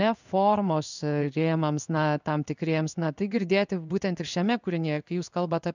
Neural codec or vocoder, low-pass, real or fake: codec, 16 kHz in and 24 kHz out, 1 kbps, XY-Tokenizer; 7.2 kHz; fake